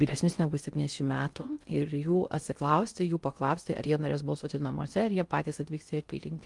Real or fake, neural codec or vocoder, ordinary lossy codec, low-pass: fake; codec, 16 kHz in and 24 kHz out, 0.6 kbps, FocalCodec, streaming, 2048 codes; Opus, 32 kbps; 10.8 kHz